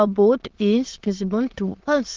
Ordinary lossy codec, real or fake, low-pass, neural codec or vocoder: Opus, 16 kbps; fake; 7.2 kHz; autoencoder, 22.05 kHz, a latent of 192 numbers a frame, VITS, trained on many speakers